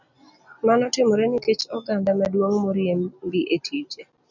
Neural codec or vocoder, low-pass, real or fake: none; 7.2 kHz; real